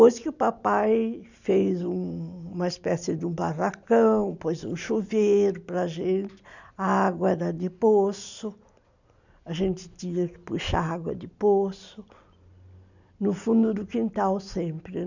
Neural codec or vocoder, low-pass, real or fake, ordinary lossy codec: none; 7.2 kHz; real; none